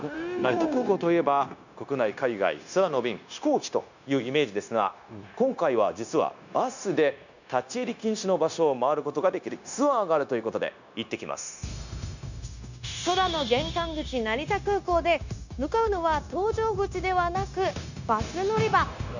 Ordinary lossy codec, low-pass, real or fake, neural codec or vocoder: none; 7.2 kHz; fake; codec, 16 kHz, 0.9 kbps, LongCat-Audio-Codec